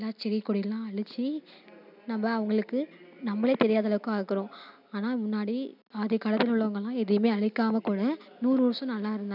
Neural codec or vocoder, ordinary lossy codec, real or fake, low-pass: none; none; real; 5.4 kHz